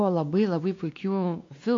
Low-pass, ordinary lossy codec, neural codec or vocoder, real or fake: 7.2 kHz; AAC, 48 kbps; codec, 16 kHz, 2 kbps, X-Codec, WavLM features, trained on Multilingual LibriSpeech; fake